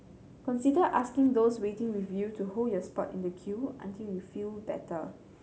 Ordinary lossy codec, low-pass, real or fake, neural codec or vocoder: none; none; real; none